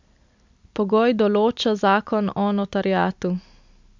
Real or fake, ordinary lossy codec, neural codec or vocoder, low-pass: real; MP3, 64 kbps; none; 7.2 kHz